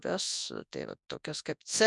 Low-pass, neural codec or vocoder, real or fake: 10.8 kHz; codec, 24 kHz, 0.9 kbps, WavTokenizer, large speech release; fake